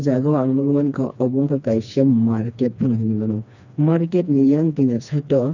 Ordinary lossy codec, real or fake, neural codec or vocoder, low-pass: none; fake; codec, 16 kHz, 2 kbps, FreqCodec, smaller model; 7.2 kHz